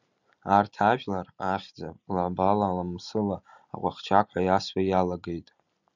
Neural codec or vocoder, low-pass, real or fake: none; 7.2 kHz; real